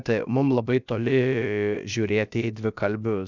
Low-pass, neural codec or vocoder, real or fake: 7.2 kHz; codec, 16 kHz, about 1 kbps, DyCAST, with the encoder's durations; fake